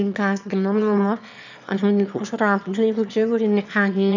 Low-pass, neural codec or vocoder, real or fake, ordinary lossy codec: 7.2 kHz; autoencoder, 22.05 kHz, a latent of 192 numbers a frame, VITS, trained on one speaker; fake; none